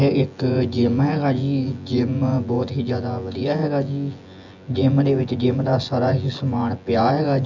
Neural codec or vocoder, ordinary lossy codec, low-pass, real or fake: vocoder, 24 kHz, 100 mel bands, Vocos; none; 7.2 kHz; fake